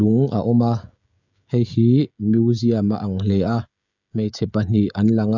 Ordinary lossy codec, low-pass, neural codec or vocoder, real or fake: none; 7.2 kHz; codec, 16 kHz, 16 kbps, FreqCodec, smaller model; fake